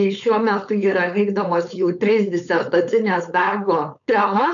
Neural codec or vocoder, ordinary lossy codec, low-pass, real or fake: codec, 16 kHz, 4.8 kbps, FACodec; AAC, 64 kbps; 7.2 kHz; fake